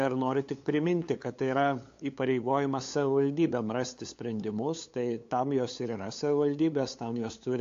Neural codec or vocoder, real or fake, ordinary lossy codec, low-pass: codec, 16 kHz, 8 kbps, FunCodec, trained on LibriTTS, 25 frames a second; fake; AAC, 48 kbps; 7.2 kHz